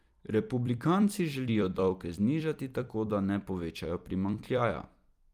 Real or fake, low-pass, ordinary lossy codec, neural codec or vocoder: fake; 14.4 kHz; Opus, 32 kbps; vocoder, 44.1 kHz, 128 mel bands every 256 samples, BigVGAN v2